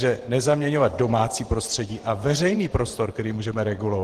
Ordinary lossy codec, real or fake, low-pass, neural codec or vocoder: Opus, 16 kbps; fake; 14.4 kHz; vocoder, 48 kHz, 128 mel bands, Vocos